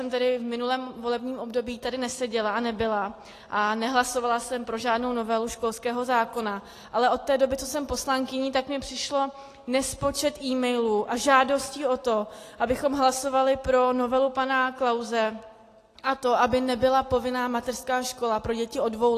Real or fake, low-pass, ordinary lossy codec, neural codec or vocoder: real; 14.4 kHz; AAC, 48 kbps; none